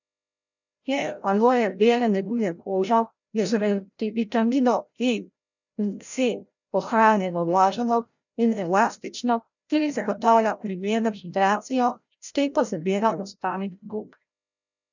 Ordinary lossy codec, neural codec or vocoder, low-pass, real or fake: none; codec, 16 kHz, 0.5 kbps, FreqCodec, larger model; 7.2 kHz; fake